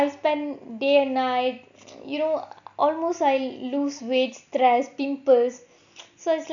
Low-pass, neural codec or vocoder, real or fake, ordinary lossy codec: 7.2 kHz; none; real; none